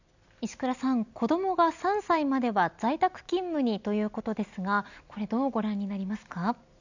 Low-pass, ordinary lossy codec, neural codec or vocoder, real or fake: 7.2 kHz; none; none; real